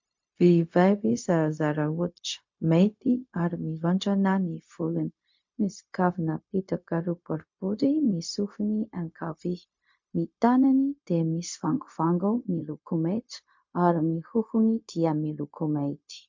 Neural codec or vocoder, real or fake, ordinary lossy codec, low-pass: codec, 16 kHz, 0.4 kbps, LongCat-Audio-Codec; fake; MP3, 48 kbps; 7.2 kHz